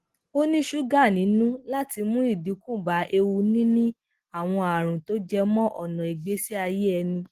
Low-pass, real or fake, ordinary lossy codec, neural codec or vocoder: 14.4 kHz; real; Opus, 16 kbps; none